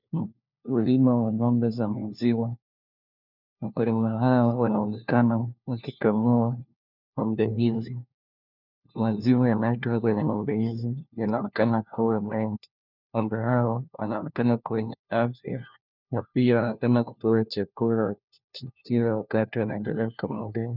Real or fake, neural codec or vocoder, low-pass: fake; codec, 16 kHz, 1 kbps, FunCodec, trained on LibriTTS, 50 frames a second; 5.4 kHz